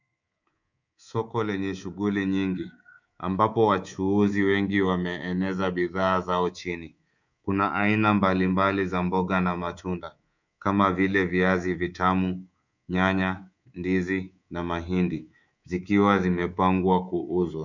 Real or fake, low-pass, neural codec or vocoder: fake; 7.2 kHz; codec, 24 kHz, 3.1 kbps, DualCodec